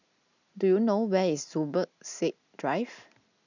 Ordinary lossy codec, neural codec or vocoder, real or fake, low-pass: none; none; real; 7.2 kHz